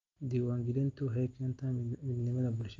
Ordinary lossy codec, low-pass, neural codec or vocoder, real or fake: Opus, 32 kbps; 7.2 kHz; none; real